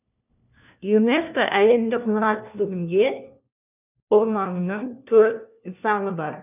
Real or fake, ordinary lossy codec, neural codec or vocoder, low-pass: fake; none; codec, 16 kHz, 1 kbps, FunCodec, trained on LibriTTS, 50 frames a second; 3.6 kHz